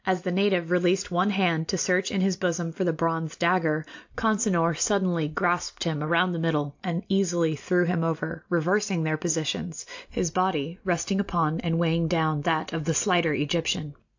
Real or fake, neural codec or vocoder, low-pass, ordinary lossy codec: real; none; 7.2 kHz; AAC, 48 kbps